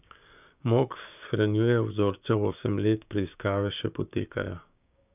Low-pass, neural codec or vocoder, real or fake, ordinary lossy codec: 3.6 kHz; codec, 16 kHz, 6 kbps, DAC; fake; none